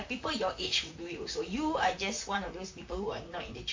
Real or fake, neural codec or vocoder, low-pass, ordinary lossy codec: fake; vocoder, 44.1 kHz, 128 mel bands, Pupu-Vocoder; 7.2 kHz; MP3, 48 kbps